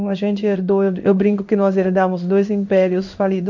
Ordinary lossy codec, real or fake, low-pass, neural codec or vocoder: none; fake; 7.2 kHz; codec, 16 kHz in and 24 kHz out, 1 kbps, XY-Tokenizer